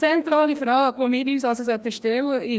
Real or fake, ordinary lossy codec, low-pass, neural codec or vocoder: fake; none; none; codec, 16 kHz, 1 kbps, FreqCodec, larger model